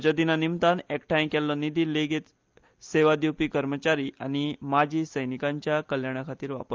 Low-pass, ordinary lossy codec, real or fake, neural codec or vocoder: 7.2 kHz; Opus, 24 kbps; real; none